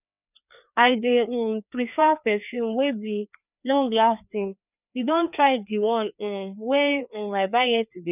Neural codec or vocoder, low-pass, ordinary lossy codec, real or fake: codec, 16 kHz, 2 kbps, FreqCodec, larger model; 3.6 kHz; none; fake